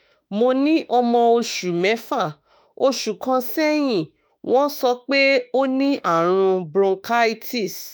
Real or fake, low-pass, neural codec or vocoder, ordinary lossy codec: fake; none; autoencoder, 48 kHz, 32 numbers a frame, DAC-VAE, trained on Japanese speech; none